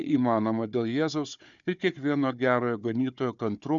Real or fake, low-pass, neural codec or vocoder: fake; 7.2 kHz; codec, 16 kHz, 4 kbps, FunCodec, trained on Chinese and English, 50 frames a second